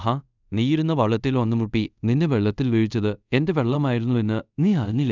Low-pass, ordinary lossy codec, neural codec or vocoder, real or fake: 7.2 kHz; none; codec, 24 kHz, 0.5 kbps, DualCodec; fake